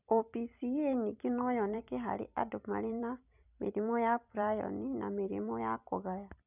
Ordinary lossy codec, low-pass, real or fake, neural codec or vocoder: none; 3.6 kHz; fake; vocoder, 44.1 kHz, 128 mel bands every 256 samples, BigVGAN v2